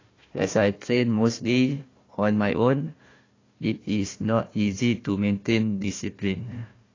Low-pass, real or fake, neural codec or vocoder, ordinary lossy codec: 7.2 kHz; fake; codec, 16 kHz, 1 kbps, FunCodec, trained on Chinese and English, 50 frames a second; AAC, 32 kbps